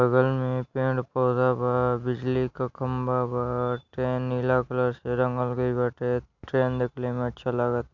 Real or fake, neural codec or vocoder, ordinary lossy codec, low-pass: real; none; MP3, 64 kbps; 7.2 kHz